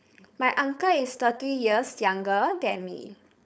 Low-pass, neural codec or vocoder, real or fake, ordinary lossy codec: none; codec, 16 kHz, 4.8 kbps, FACodec; fake; none